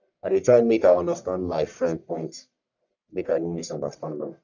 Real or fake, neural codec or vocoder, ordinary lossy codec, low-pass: fake; codec, 44.1 kHz, 1.7 kbps, Pupu-Codec; none; 7.2 kHz